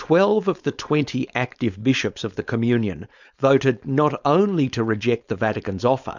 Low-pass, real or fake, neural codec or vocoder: 7.2 kHz; fake; codec, 16 kHz, 4.8 kbps, FACodec